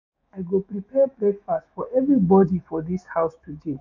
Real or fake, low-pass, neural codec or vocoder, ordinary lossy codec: fake; 7.2 kHz; autoencoder, 48 kHz, 128 numbers a frame, DAC-VAE, trained on Japanese speech; none